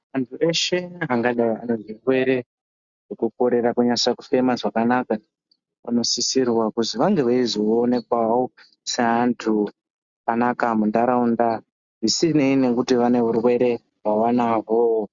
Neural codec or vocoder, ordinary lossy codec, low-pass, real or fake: none; Opus, 64 kbps; 7.2 kHz; real